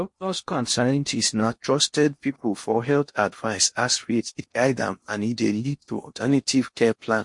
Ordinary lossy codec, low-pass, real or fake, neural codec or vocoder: MP3, 48 kbps; 10.8 kHz; fake; codec, 16 kHz in and 24 kHz out, 0.6 kbps, FocalCodec, streaming, 4096 codes